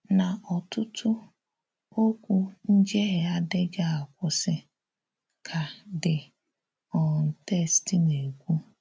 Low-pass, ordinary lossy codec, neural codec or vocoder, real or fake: none; none; none; real